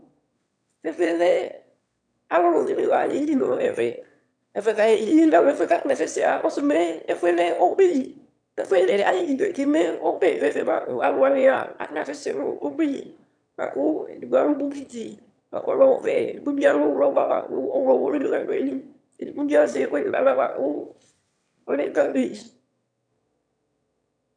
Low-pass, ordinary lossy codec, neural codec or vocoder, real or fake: 9.9 kHz; MP3, 96 kbps; autoencoder, 22.05 kHz, a latent of 192 numbers a frame, VITS, trained on one speaker; fake